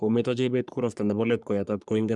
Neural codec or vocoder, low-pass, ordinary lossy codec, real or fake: codec, 44.1 kHz, 3.4 kbps, Pupu-Codec; 10.8 kHz; none; fake